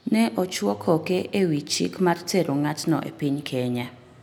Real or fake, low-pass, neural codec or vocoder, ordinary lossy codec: real; none; none; none